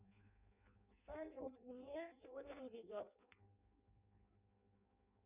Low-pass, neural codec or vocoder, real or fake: 3.6 kHz; codec, 16 kHz in and 24 kHz out, 0.6 kbps, FireRedTTS-2 codec; fake